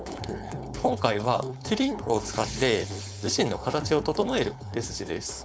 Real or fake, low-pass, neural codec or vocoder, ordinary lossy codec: fake; none; codec, 16 kHz, 4.8 kbps, FACodec; none